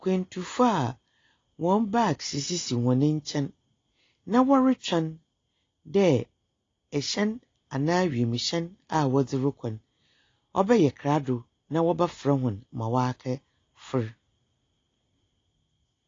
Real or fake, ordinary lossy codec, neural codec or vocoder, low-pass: real; AAC, 32 kbps; none; 7.2 kHz